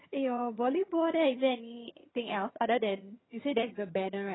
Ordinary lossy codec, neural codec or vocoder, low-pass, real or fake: AAC, 16 kbps; vocoder, 22.05 kHz, 80 mel bands, HiFi-GAN; 7.2 kHz; fake